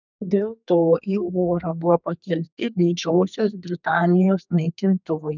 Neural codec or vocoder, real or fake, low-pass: codec, 32 kHz, 1.9 kbps, SNAC; fake; 7.2 kHz